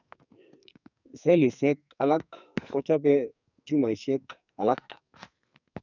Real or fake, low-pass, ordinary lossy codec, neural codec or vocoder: fake; 7.2 kHz; none; codec, 44.1 kHz, 2.6 kbps, SNAC